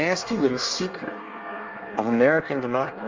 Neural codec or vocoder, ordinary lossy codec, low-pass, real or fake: codec, 24 kHz, 1 kbps, SNAC; Opus, 32 kbps; 7.2 kHz; fake